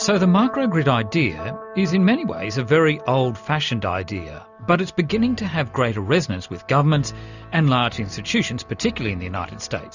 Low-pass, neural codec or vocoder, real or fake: 7.2 kHz; none; real